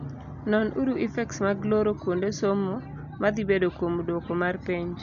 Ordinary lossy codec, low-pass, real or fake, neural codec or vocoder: none; 7.2 kHz; real; none